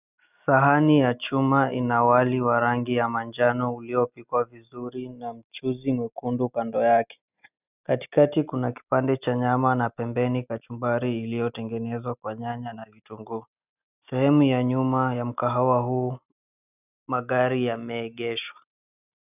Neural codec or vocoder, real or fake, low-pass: none; real; 3.6 kHz